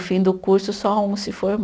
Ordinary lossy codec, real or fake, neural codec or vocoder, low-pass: none; real; none; none